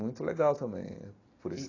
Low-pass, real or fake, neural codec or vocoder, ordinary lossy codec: 7.2 kHz; real; none; AAC, 32 kbps